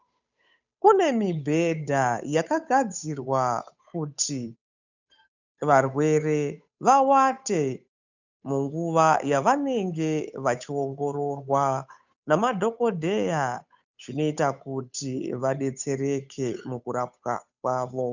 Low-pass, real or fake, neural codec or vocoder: 7.2 kHz; fake; codec, 16 kHz, 8 kbps, FunCodec, trained on Chinese and English, 25 frames a second